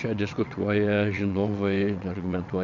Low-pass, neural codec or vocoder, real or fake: 7.2 kHz; vocoder, 22.05 kHz, 80 mel bands, WaveNeXt; fake